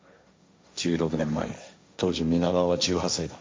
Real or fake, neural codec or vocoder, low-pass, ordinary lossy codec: fake; codec, 16 kHz, 1.1 kbps, Voila-Tokenizer; none; none